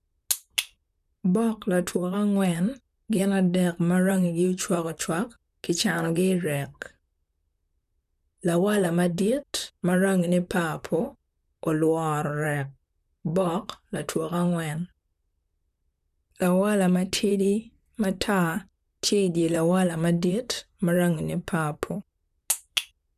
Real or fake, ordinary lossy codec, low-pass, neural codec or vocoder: fake; none; 14.4 kHz; vocoder, 44.1 kHz, 128 mel bands, Pupu-Vocoder